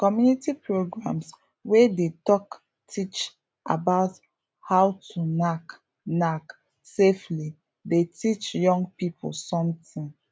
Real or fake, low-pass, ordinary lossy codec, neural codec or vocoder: real; none; none; none